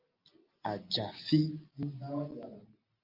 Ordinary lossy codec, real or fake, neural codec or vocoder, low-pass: Opus, 24 kbps; real; none; 5.4 kHz